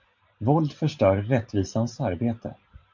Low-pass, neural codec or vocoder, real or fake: 7.2 kHz; none; real